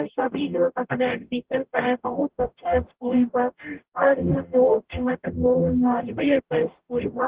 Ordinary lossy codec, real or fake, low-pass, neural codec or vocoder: Opus, 16 kbps; fake; 3.6 kHz; codec, 44.1 kHz, 0.9 kbps, DAC